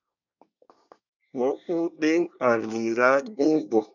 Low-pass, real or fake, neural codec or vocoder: 7.2 kHz; fake; codec, 24 kHz, 1 kbps, SNAC